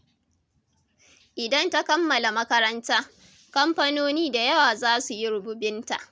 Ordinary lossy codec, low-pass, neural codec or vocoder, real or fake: none; none; none; real